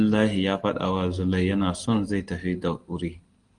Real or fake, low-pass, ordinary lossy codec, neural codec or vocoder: real; 9.9 kHz; Opus, 24 kbps; none